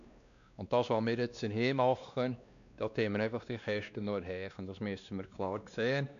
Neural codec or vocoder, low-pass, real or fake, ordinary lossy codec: codec, 16 kHz, 2 kbps, X-Codec, WavLM features, trained on Multilingual LibriSpeech; 7.2 kHz; fake; none